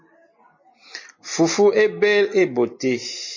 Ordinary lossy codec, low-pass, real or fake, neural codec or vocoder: MP3, 32 kbps; 7.2 kHz; real; none